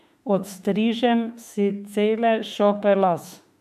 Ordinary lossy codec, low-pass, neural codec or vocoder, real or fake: AAC, 96 kbps; 14.4 kHz; autoencoder, 48 kHz, 32 numbers a frame, DAC-VAE, trained on Japanese speech; fake